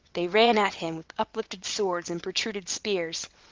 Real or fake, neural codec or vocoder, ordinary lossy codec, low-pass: fake; vocoder, 22.05 kHz, 80 mel bands, WaveNeXt; Opus, 32 kbps; 7.2 kHz